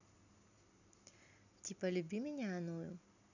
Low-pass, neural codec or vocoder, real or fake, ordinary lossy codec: 7.2 kHz; none; real; none